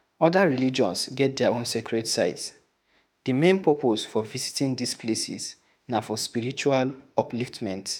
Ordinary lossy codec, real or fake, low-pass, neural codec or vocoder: none; fake; none; autoencoder, 48 kHz, 32 numbers a frame, DAC-VAE, trained on Japanese speech